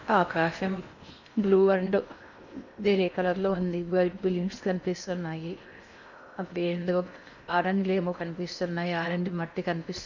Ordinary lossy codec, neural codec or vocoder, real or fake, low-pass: none; codec, 16 kHz in and 24 kHz out, 0.6 kbps, FocalCodec, streaming, 2048 codes; fake; 7.2 kHz